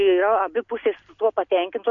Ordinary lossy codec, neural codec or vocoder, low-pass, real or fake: AAC, 48 kbps; none; 7.2 kHz; real